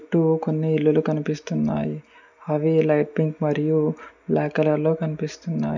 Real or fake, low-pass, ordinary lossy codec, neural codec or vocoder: real; 7.2 kHz; none; none